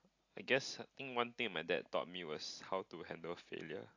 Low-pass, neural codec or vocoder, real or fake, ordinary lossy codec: 7.2 kHz; none; real; AAC, 48 kbps